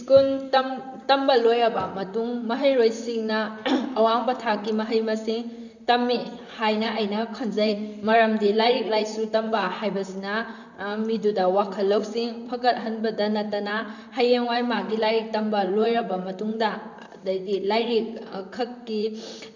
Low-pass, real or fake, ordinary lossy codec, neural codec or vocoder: 7.2 kHz; fake; none; vocoder, 44.1 kHz, 128 mel bands, Pupu-Vocoder